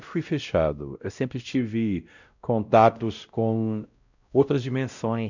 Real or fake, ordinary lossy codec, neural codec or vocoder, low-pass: fake; none; codec, 16 kHz, 0.5 kbps, X-Codec, WavLM features, trained on Multilingual LibriSpeech; 7.2 kHz